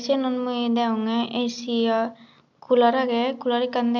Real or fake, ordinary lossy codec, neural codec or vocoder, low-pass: real; none; none; 7.2 kHz